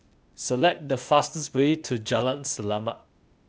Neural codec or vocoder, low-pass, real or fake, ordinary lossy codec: codec, 16 kHz, 0.8 kbps, ZipCodec; none; fake; none